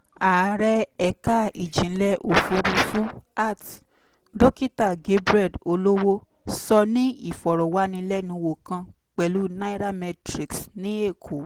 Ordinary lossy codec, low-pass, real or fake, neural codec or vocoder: Opus, 16 kbps; 19.8 kHz; real; none